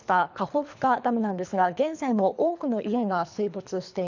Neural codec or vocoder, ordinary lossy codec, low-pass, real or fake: codec, 24 kHz, 3 kbps, HILCodec; none; 7.2 kHz; fake